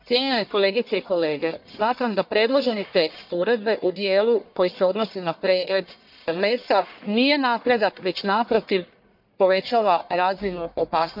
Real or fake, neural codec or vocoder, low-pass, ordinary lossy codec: fake; codec, 44.1 kHz, 1.7 kbps, Pupu-Codec; 5.4 kHz; MP3, 48 kbps